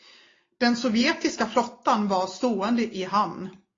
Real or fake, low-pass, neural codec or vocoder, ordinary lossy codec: real; 7.2 kHz; none; AAC, 32 kbps